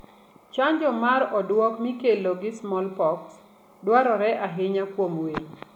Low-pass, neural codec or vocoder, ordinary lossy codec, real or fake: 19.8 kHz; none; none; real